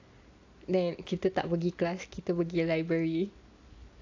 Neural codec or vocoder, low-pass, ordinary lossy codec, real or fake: none; 7.2 kHz; AAC, 48 kbps; real